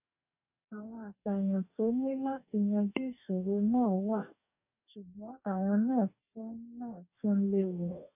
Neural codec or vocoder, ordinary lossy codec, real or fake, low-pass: codec, 44.1 kHz, 2.6 kbps, DAC; none; fake; 3.6 kHz